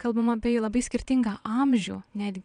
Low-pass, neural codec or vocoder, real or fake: 9.9 kHz; vocoder, 22.05 kHz, 80 mel bands, Vocos; fake